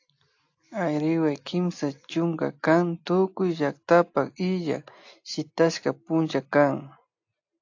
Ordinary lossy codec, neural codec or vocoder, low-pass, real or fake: AAC, 48 kbps; none; 7.2 kHz; real